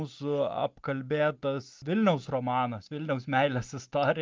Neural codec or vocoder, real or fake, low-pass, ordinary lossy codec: none; real; 7.2 kHz; Opus, 32 kbps